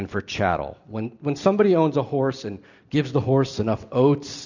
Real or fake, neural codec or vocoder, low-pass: real; none; 7.2 kHz